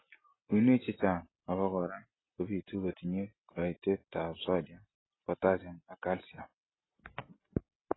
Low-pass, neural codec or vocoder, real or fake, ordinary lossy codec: 7.2 kHz; none; real; AAC, 16 kbps